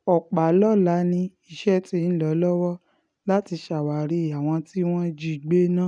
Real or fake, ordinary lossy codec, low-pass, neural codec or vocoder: real; none; 9.9 kHz; none